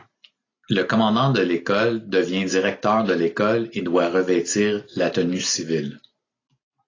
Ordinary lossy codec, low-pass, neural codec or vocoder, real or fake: AAC, 32 kbps; 7.2 kHz; none; real